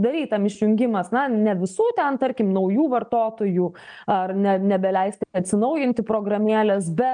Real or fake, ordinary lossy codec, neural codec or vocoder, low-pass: real; MP3, 96 kbps; none; 9.9 kHz